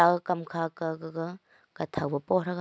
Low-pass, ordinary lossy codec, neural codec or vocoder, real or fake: none; none; none; real